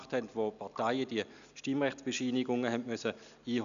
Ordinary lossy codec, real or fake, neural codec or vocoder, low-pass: none; real; none; 7.2 kHz